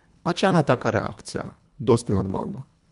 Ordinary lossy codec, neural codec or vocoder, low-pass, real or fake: none; codec, 24 kHz, 1.5 kbps, HILCodec; 10.8 kHz; fake